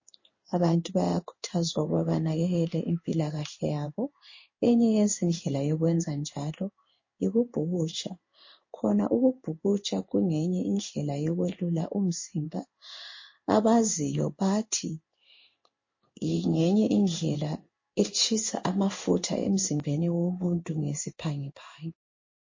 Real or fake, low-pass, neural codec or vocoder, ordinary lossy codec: fake; 7.2 kHz; codec, 16 kHz in and 24 kHz out, 1 kbps, XY-Tokenizer; MP3, 32 kbps